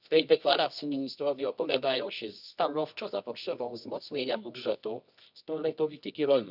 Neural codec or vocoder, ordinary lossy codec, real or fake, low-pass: codec, 24 kHz, 0.9 kbps, WavTokenizer, medium music audio release; none; fake; 5.4 kHz